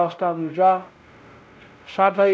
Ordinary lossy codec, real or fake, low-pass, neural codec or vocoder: none; fake; none; codec, 16 kHz, 0.5 kbps, X-Codec, WavLM features, trained on Multilingual LibriSpeech